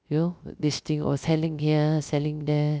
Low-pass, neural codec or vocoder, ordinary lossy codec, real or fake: none; codec, 16 kHz, 0.3 kbps, FocalCodec; none; fake